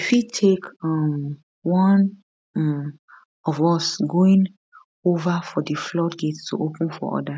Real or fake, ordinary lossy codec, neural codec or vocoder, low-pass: real; none; none; none